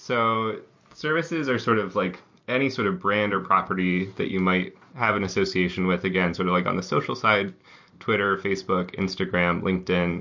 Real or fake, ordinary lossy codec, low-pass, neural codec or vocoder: real; MP3, 48 kbps; 7.2 kHz; none